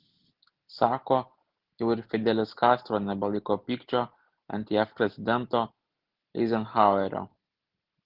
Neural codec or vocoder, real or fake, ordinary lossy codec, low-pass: none; real; Opus, 16 kbps; 5.4 kHz